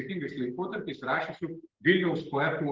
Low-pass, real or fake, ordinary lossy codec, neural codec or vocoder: 7.2 kHz; real; Opus, 16 kbps; none